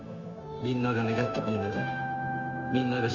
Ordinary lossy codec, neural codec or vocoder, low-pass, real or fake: Opus, 64 kbps; codec, 16 kHz, 0.9 kbps, LongCat-Audio-Codec; 7.2 kHz; fake